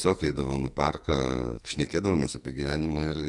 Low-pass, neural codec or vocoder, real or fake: 10.8 kHz; codec, 44.1 kHz, 2.6 kbps, SNAC; fake